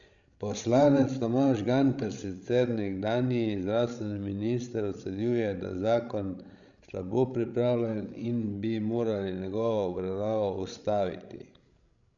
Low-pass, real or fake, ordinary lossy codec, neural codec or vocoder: 7.2 kHz; fake; none; codec, 16 kHz, 16 kbps, FreqCodec, larger model